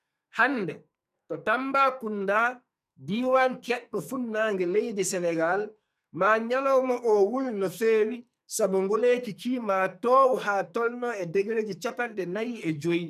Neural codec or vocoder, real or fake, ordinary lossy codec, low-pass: codec, 44.1 kHz, 2.6 kbps, SNAC; fake; none; 14.4 kHz